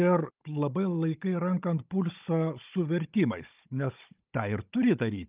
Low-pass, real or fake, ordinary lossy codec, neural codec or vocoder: 3.6 kHz; fake; Opus, 24 kbps; codec, 16 kHz, 16 kbps, FunCodec, trained on Chinese and English, 50 frames a second